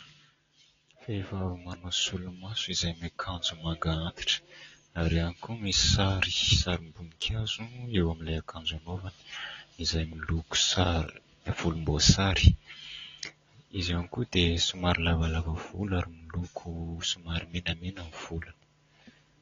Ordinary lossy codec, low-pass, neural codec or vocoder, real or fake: AAC, 32 kbps; 7.2 kHz; none; real